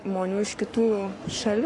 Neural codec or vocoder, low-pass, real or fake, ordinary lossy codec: codec, 44.1 kHz, 7.8 kbps, Pupu-Codec; 10.8 kHz; fake; AAC, 48 kbps